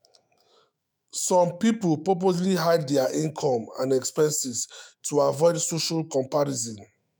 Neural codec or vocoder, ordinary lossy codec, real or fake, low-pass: autoencoder, 48 kHz, 128 numbers a frame, DAC-VAE, trained on Japanese speech; none; fake; none